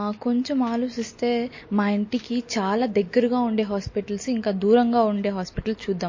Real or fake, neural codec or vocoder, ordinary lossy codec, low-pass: real; none; MP3, 32 kbps; 7.2 kHz